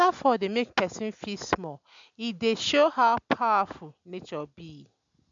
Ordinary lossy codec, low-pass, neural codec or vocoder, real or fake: MP3, 64 kbps; 7.2 kHz; none; real